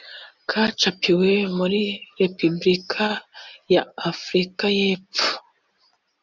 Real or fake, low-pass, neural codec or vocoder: real; 7.2 kHz; none